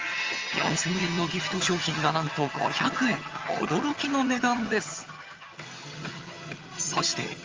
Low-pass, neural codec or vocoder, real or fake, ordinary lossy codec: 7.2 kHz; vocoder, 22.05 kHz, 80 mel bands, HiFi-GAN; fake; Opus, 32 kbps